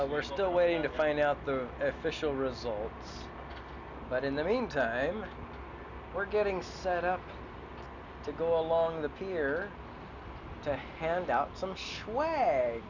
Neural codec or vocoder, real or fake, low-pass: none; real; 7.2 kHz